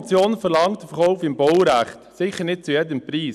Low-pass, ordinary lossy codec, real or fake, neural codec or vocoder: none; none; real; none